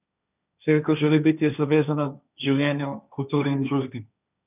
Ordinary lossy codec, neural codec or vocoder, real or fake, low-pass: none; codec, 16 kHz, 1.1 kbps, Voila-Tokenizer; fake; 3.6 kHz